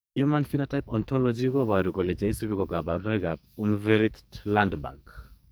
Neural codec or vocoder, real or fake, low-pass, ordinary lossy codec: codec, 44.1 kHz, 2.6 kbps, SNAC; fake; none; none